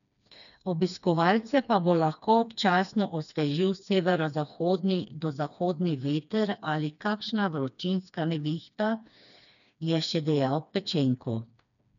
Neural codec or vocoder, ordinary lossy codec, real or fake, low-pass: codec, 16 kHz, 2 kbps, FreqCodec, smaller model; none; fake; 7.2 kHz